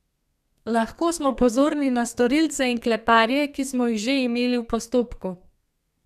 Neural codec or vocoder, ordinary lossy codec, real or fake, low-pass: codec, 32 kHz, 1.9 kbps, SNAC; none; fake; 14.4 kHz